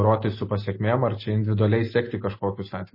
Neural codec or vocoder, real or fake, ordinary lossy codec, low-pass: none; real; MP3, 24 kbps; 5.4 kHz